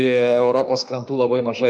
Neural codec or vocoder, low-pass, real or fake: autoencoder, 48 kHz, 32 numbers a frame, DAC-VAE, trained on Japanese speech; 9.9 kHz; fake